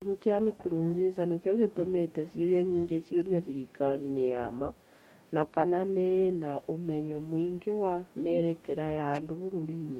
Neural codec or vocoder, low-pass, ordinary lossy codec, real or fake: codec, 44.1 kHz, 2.6 kbps, DAC; 19.8 kHz; MP3, 64 kbps; fake